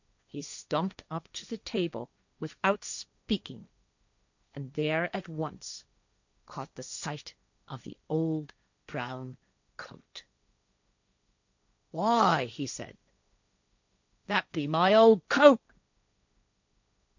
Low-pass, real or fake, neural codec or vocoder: 7.2 kHz; fake; codec, 16 kHz, 1.1 kbps, Voila-Tokenizer